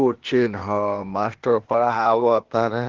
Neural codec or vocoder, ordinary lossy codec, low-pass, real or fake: codec, 16 kHz, 0.8 kbps, ZipCodec; Opus, 16 kbps; 7.2 kHz; fake